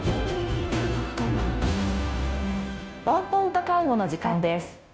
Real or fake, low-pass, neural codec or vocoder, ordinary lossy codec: fake; none; codec, 16 kHz, 0.5 kbps, FunCodec, trained on Chinese and English, 25 frames a second; none